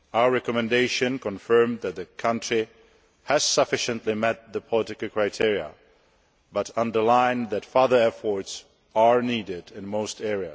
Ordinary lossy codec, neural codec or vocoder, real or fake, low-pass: none; none; real; none